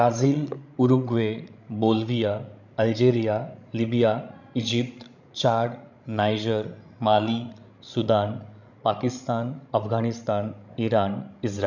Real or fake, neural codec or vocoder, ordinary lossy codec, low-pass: fake; codec, 16 kHz, 16 kbps, FreqCodec, larger model; none; 7.2 kHz